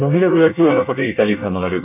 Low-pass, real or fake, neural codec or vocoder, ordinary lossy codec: 3.6 kHz; fake; codec, 24 kHz, 1 kbps, SNAC; none